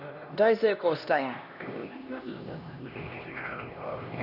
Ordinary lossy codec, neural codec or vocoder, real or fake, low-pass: none; codec, 16 kHz, 1 kbps, X-Codec, HuBERT features, trained on LibriSpeech; fake; 5.4 kHz